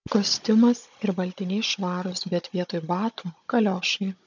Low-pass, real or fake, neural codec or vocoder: 7.2 kHz; fake; codec, 16 kHz, 16 kbps, FunCodec, trained on Chinese and English, 50 frames a second